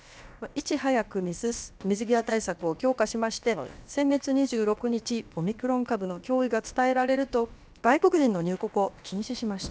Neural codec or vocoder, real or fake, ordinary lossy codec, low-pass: codec, 16 kHz, about 1 kbps, DyCAST, with the encoder's durations; fake; none; none